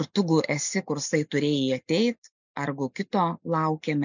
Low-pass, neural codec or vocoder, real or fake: 7.2 kHz; none; real